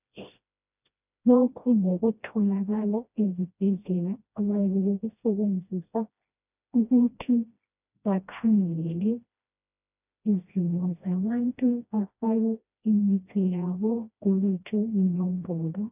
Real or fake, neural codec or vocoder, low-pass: fake; codec, 16 kHz, 1 kbps, FreqCodec, smaller model; 3.6 kHz